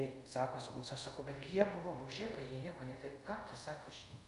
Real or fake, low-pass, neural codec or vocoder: fake; 10.8 kHz; codec, 24 kHz, 0.5 kbps, DualCodec